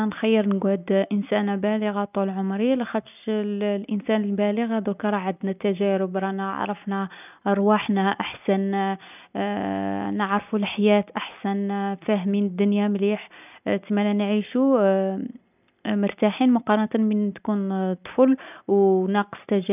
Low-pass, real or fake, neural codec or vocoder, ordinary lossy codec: 3.6 kHz; real; none; none